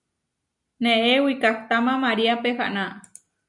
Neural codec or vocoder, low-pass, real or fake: none; 10.8 kHz; real